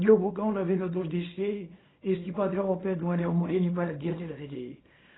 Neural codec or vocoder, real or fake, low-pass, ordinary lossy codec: codec, 24 kHz, 0.9 kbps, WavTokenizer, small release; fake; 7.2 kHz; AAC, 16 kbps